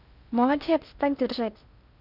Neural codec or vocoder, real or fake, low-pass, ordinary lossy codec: codec, 16 kHz in and 24 kHz out, 0.6 kbps, FocalCodec, streaming, 4096 codes; fake; 5.4 kHz; none